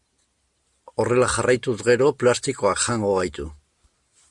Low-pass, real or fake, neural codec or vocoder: 10.8 kHz; real; none